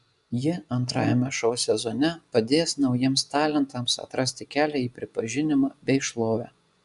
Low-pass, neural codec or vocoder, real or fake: 10.8 kHz; vocoder, 24 kHz, 100 mel bands, Vocos; fake